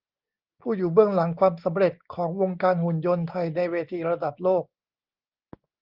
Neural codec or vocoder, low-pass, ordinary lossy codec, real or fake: none; 5.4 kHz; Opus, 32 kbps; real